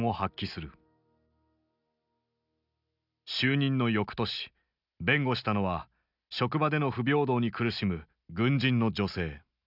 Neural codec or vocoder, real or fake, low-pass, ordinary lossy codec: none; real; 5.4 kHz; none